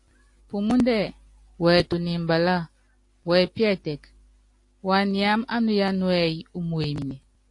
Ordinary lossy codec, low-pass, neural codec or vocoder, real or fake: AAC, 48 kbps; 10.8 kHz; none; real